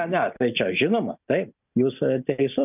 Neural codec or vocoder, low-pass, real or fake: none; 3.6 kHz; real